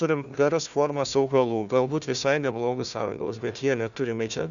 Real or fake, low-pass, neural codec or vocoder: fake; 7.2 kHz; codec, 16 kHz, 1 kbps, FunCodec, trained on Chinese and English, 50 frames a second